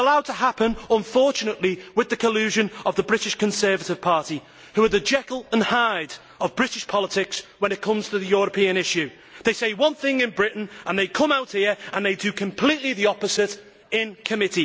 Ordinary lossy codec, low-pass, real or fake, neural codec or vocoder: none; none; real; none